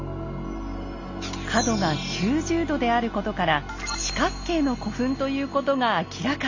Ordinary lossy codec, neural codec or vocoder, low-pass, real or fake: none; none; 7.2 kHz; real